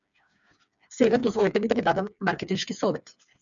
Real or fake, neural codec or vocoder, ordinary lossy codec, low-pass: fake; codec, 16 kHz, 4 kbps, FreqCodec, smaller model; MP3, 96 kbps; 7.2 kHz